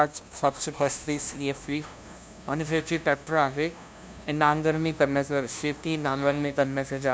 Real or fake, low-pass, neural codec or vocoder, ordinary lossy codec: fake; none; codec, 16 kHz, 0.5 kbps, FunCodec, trained on LibriTTS, 25 frames a second; none